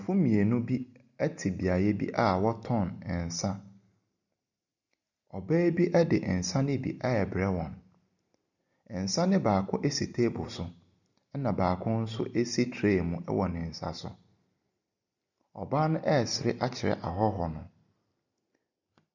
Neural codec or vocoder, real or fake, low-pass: none; real; 7.2 kHz